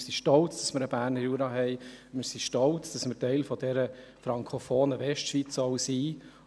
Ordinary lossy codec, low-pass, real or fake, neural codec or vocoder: none; none; real; none